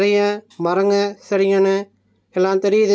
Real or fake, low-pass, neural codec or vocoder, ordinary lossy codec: real; none; none; none